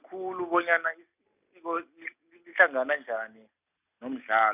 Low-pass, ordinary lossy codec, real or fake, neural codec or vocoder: 3.6 kHz; none; real; none